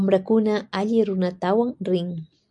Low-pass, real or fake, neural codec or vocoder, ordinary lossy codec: 10.8 kHz; real; none; MP3, 64 kbps